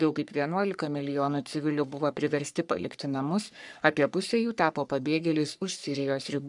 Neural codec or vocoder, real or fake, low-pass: codec, 44.1 kHz, 3.4 kbps, Pupu-Codec; fake; 10.8 kHz